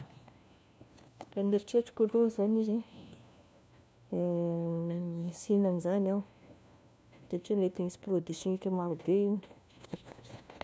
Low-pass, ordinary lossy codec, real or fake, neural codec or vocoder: none; none; fake; codec, 16 kHz, 1 kbps, FunCodec, trained on LibriTTS, 50 frames a second